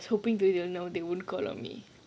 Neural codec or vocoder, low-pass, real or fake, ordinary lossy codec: none; none; real; none